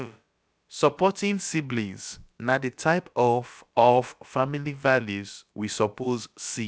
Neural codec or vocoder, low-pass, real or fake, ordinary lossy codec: codec, 16 kHz, about 1 kbps, DyCAST, with the encoder's durations; none; fake; none